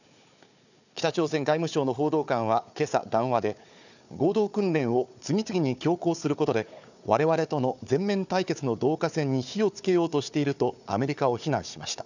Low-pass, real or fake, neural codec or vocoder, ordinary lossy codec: 7.2 kHz; fake; codec, 16 kHz, 4 kbps, FunCodec, trained on Chinese and English, 50 frames a second; none